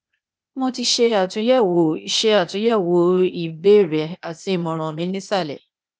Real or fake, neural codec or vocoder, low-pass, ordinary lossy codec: fake; codec, 16 kHz, 0.8 kbps, ZipCodec; none; none